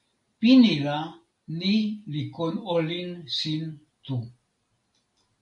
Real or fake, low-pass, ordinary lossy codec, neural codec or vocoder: real; 10.8 kHz; AAC, 64 kbps; none